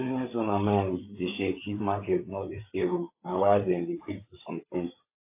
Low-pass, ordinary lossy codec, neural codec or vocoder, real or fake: 3.6 kHz; AAC, 24 kbps; codec, 16 kHz, 4 kbps, FreqCodec, larger model; fake